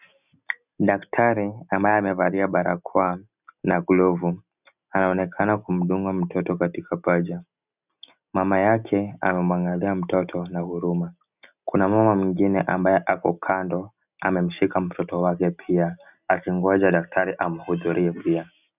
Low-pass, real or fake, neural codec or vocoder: 3.6 kHz; real; none